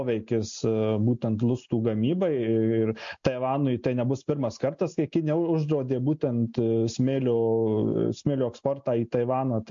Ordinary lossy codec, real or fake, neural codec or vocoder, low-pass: MP3, 48 kbps; real; none; 7.2 kHz